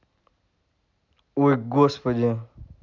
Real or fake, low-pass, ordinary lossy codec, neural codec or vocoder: real; 7.2 kHz; none; none